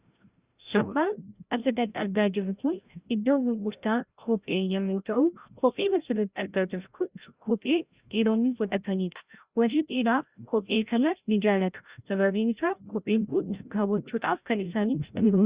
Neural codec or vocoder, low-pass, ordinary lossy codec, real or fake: codec, 16 kHz, 0.5 kbps, FreqCodec, larger model; 3.6 kHz; Opus, 64 kbps; fake